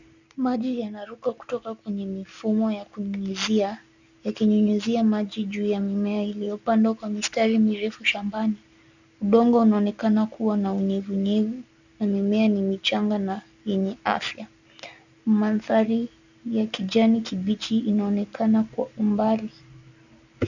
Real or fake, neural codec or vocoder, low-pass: real; none; 7.2 kHz